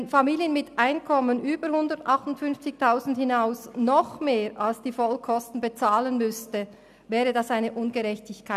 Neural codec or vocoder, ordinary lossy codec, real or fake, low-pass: none; none; real; 14.4 kHz